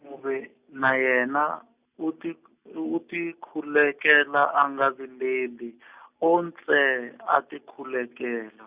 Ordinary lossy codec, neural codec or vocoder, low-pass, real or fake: Opus, 64 kbps; none; 3.6 kHz; real